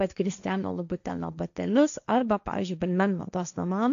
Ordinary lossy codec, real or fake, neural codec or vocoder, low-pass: AAC, 96 kbps; fake; codec, 16 kHz, 1.1 kbps, Voila-Tokenizer; 7.2 kHz